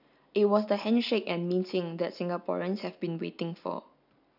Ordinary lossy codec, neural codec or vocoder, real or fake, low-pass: none; none; real; 5.4 kHz